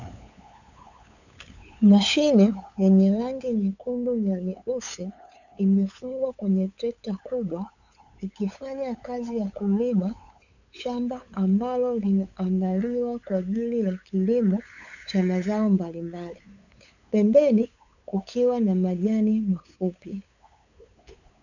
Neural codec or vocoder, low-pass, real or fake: codec, 16 kHz, 8 kbps, FunCodec, trained on LibriTTS, 25 frames a second; 7.2 kHz; fake